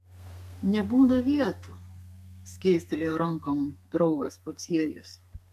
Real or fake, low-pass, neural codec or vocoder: fake; 14.4 kHz; codec, 32 kHz, 1.9 kbps, SNAC